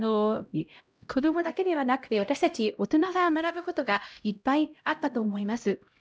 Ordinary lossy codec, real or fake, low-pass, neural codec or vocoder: none; fake; none; codec, 16 kHz, 0.5 kbps, X-Codec, HuBERT features, trained on LibriSpeech